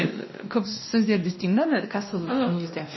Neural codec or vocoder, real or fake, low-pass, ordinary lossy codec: codec, 16 kHz, 1 kbps, X-Codec, WavLM features, trained on Multilingual LibriSpeech; fake; 7.2 kHz; MP3, 24 kbps